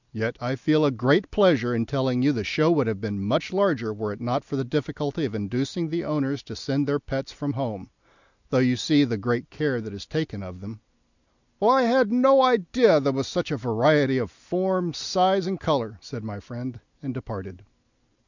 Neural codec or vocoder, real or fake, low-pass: none; real; 7.2 kHz